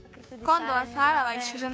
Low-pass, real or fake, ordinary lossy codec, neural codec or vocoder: none; fake; none; codec, 16 kHz, 6 kbps, DAC